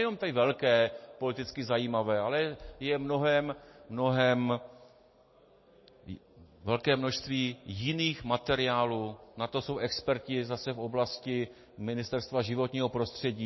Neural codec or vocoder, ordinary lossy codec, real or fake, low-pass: none; MP3, 24 kbps; real; 7.2 kHz